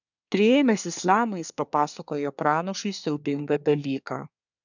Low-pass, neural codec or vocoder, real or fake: 7.2 kHz; codec, 24 kHz, 1 kbps, SNAC; fake